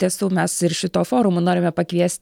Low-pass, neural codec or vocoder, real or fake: 19.8 kHz; none; real